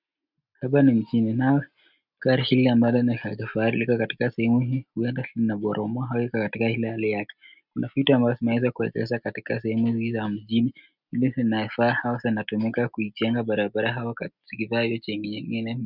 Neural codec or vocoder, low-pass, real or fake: none; 5.4 kHz; real